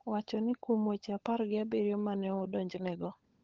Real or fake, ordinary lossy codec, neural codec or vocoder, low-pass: fake; Opus, 16 kbps; codec, 16 kHz, 16 kbps, FunCodec, trained on LibriTTS, 50 frames a second; 7.2 kHz